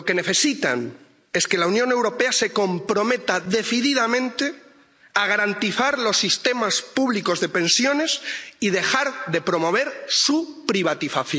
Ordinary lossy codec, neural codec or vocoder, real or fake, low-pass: none; none; real; none